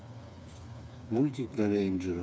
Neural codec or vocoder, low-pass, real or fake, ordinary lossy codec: codec, 16 kHz, 4 kbps, FreqCodec, smaller model; none; fake; none